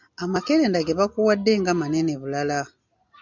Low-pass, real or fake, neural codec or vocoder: 7.2 kHz; real; none